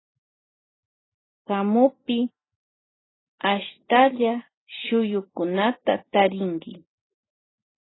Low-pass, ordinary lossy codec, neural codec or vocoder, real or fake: 7.2 kHz; AAC, 16 kbps; none; real